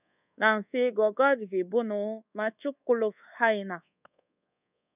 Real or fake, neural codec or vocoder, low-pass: fake; codec, 24 kHz, 1.2 kbps, DualCodec; 3.6 kHz